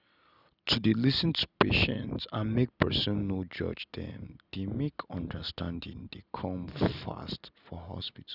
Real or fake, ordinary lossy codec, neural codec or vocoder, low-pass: real; none; none; 5.4 kHz